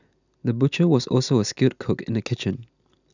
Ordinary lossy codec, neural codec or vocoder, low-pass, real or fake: none; none; 7.2 kHz; real